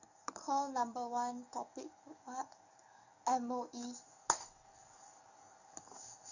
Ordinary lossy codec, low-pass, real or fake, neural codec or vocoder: none; 7.2 kHz; fake; codec, 44.1 kHz, 7.8 kbps, DAC